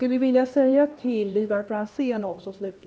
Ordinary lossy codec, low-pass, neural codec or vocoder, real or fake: none; none; codec, 16 kHz, 1 kbps, X-Codec, HuBERT features, trained on LibriSpeech; fake